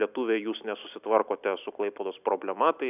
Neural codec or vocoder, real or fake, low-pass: none; real; 3.6 kHz